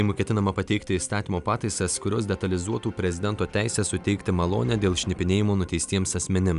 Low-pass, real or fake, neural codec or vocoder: 10.8 kHz; real; none